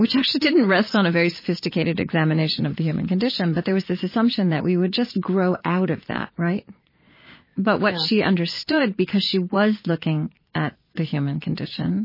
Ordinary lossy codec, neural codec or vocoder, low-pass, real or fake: MP3, 24 kbps; vocoder, 22.05 kHz, 80 mel bands, Vocos; 5.4 kHz; fake